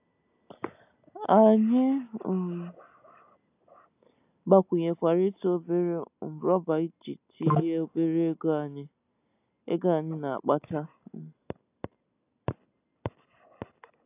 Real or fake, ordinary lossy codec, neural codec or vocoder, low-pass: real; AAC, 32 kbps; none; 3.6 kHz